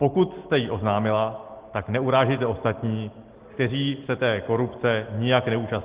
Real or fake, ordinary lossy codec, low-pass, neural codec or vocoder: real; Opus, 16 kbps; 3.6 kHz; none